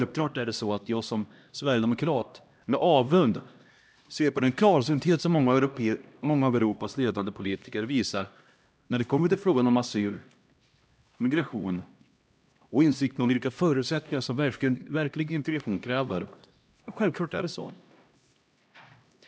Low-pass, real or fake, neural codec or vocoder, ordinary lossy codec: none; fake; codec, 16 kHz, 1 kbps, X-Codec, HuBERT features, trained on LibriSpeech; none